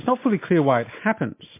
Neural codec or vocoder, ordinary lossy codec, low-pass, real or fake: none; MP3, 24 kbps; 3.6 kHz; real